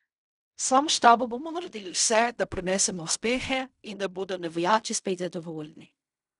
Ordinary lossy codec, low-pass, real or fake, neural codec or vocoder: none; 10.8 kHz; fake; codec, 16 kHz in and 24 kHz out, 0.4 kbps, LongCat-Audio-Codec, fine tuned four codebook decoder